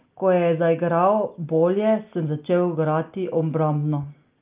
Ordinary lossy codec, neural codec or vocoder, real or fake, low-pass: Opus, 24 kbps; none; real; 3.6 kHz